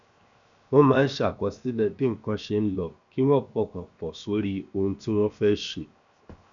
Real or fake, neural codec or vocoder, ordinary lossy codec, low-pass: fake; codec, 16 kHz, 0.7 kbps, FocalCodec; none; 7.2 kHz